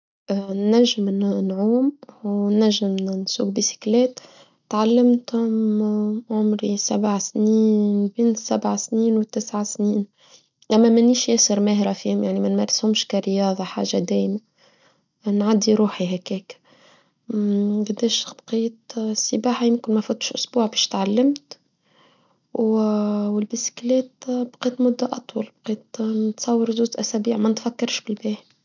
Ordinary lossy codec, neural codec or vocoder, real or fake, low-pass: none; none; real; 7.2 kHz